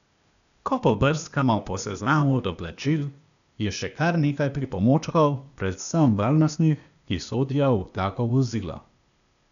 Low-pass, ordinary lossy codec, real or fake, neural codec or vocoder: 7.2 kHz; none; fake; codec, 16 kHz, 0.8 kbps, ZipCodec